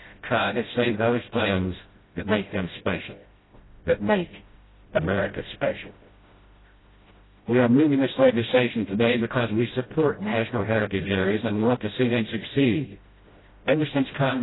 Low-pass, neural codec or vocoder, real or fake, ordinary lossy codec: 7.2 kHz; codec, 16 kHz, 0.5 kbps, FreqCodec, smaller model; fake; AAC, 16 kbps